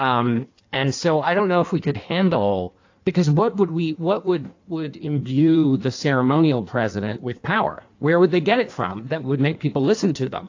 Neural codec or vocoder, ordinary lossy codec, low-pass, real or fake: codec, 16 kHz in and 24 kHz out, 1.1 kbps, FireRedTTS-2 codec; AAC, 48 kbps; 7.2 kHz; fake